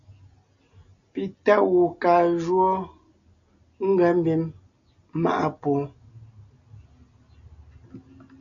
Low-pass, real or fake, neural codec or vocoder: 7.2 kHz; real; none